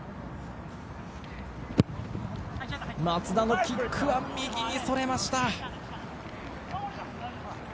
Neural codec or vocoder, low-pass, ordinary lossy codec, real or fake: none; none; none; real